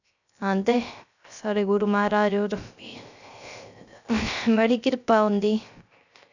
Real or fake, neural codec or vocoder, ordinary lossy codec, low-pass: fake; codec, 16 kHz, 0.3 kbps, FocalCodec; none; 7.2 kHz